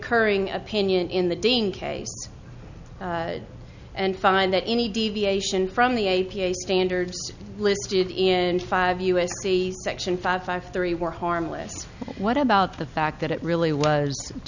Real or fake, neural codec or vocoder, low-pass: real; none; 7.2 kHz